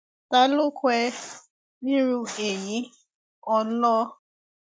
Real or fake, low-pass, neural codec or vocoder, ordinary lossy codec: real; none; none; none